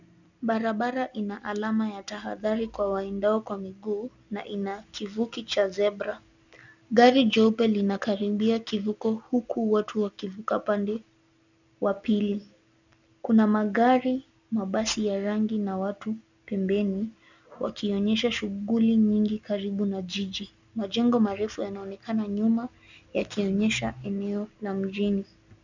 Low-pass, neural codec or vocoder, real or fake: 7.2 kHz; none; real